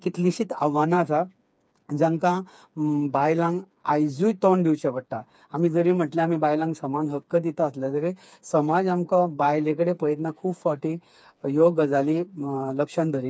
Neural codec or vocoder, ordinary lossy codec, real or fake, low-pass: codec, 16 kHz, 4 kbps, FreqCodec, smaller model; none; fake; none